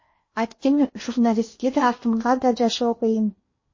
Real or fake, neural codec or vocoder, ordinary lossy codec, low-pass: fake; codec, 16 kHz in and 24 kHz out, 0.8 kbps, FocalCodec, streaming, 65536 codes; MP3, 32 kbps; 7.2 kHz